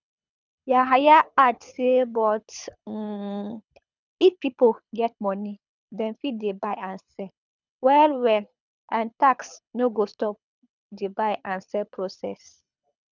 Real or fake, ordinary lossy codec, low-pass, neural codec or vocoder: fake; none; 7.2 kHz; codec, 24 kHz, 6 kbps, HILCodec